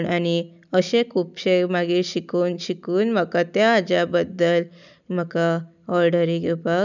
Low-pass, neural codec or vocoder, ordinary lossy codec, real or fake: 7.2 kHz; none; none; real